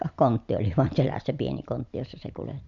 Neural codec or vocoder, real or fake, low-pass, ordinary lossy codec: none; real; 7.2 kHz; none